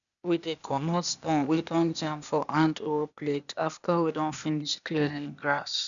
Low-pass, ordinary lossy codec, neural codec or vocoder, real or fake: 7.2 kHz; none; codec, 16 kHz, 0.8 kbps, ZipCodec; fake